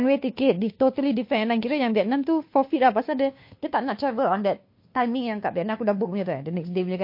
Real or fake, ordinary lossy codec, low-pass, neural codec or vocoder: fake; MP3, 32 kbps; 5.4 kHz; codec, 16 kHz, 2 kbps, FunCodec, trained on Chinese and English, 25 frames a second